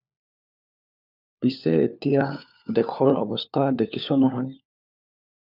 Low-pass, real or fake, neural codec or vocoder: 5.4 kHz; fake; codec, 16 kHz, 4 kbps, FunCodec, trained on LibriTTS, 50 frames a second